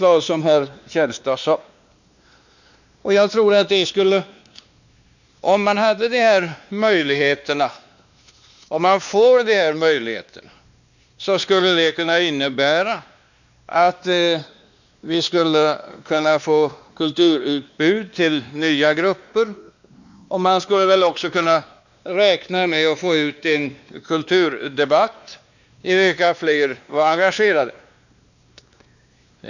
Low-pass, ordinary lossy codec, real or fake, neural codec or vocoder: 7.2 kHz; none; fake; codec, 16 kHz, 2 kbps, X-Codec, WavLM features, trained on Multilingual LibriSpeech